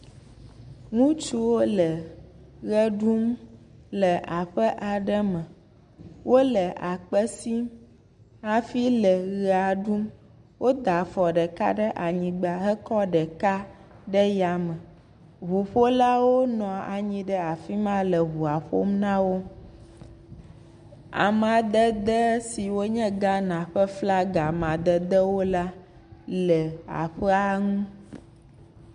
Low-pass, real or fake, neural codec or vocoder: 9.9 kHz; real; none